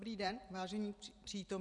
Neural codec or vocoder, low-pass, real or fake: vocoder, 44.1 kHz, 128 mel bands every 512 samples, BigVGAN v2; 10.8 kHz; fake